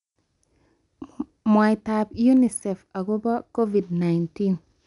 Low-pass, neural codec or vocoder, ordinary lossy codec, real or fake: 10.8 kHz; none; none; real